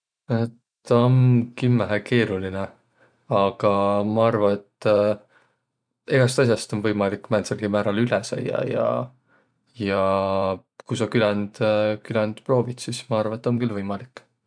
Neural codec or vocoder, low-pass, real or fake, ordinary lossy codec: vocoder, 44.1 kHz, 128 mel bands every 512 samples, BigVGAN v2; 9.9 kHz; fake; none